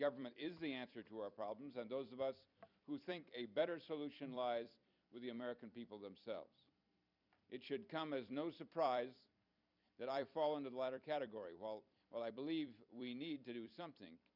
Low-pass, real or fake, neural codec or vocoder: 5.4 kHz; fake; vocoder, 44.1 kHz, 128 mel bands every 256 samples, BigVGAN v2